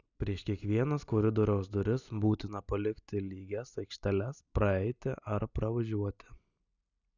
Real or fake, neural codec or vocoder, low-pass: real; none; 7.2 kHz